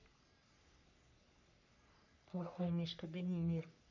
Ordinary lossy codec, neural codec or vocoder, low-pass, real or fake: none; codec, 44.1 kHz, 3.4 kbps, Pupu-Codec; 7.2 kHz; fake